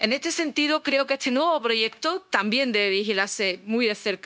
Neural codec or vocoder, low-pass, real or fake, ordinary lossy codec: codec, 16 kHz, 0.9 kbps, LongCat-Audio-Codec; none; fake; none